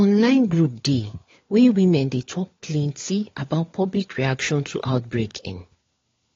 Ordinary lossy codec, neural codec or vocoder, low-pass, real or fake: AAC, 32 kbps; codec, 16 kHz, 4 kbps, FunCodec, trained on LibriTTS, 50 frames a second; 7.2 kHz; fake